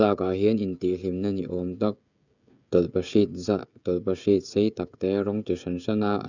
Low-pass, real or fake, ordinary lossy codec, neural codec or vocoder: 7.2 kHz; fake; none; codec, 16 kHz, 16 kbps, FreqCodec, smaller model